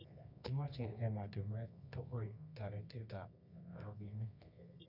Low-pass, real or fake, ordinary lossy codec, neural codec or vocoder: 5.4 kHz; fake; none; codec, 24 kHz, 0.9 kbps, WavTokenizer, medium music audio release